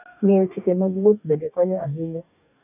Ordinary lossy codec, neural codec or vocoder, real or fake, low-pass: none; codec, 44.1 kHz, 2.6 kbps, DAC; fake; 3.6 kHz